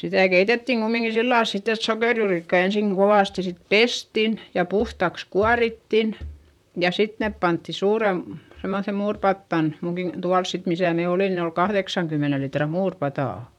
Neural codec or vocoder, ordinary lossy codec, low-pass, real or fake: vocoder, 44.1 kHz, 128 mel bands, Pupu-Vocoder; none; 19.8 kHz; fake